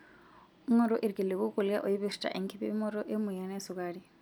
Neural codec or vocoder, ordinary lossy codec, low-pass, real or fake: none; none; none; real